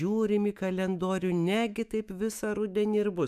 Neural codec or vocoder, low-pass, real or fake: autoencoder, 48 kHz, 128 numbers a frame, DAC-VAE, trained on Japanese speech; 14.4 kHz; fake